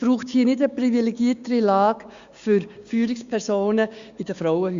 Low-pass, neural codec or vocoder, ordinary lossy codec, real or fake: 7.2 kHz; codec, 16 kHz, 6 kbps, DAC; Opus, 64 kbps; fake